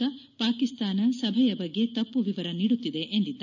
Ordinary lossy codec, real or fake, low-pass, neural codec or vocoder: none; real; 7.2 kHz; none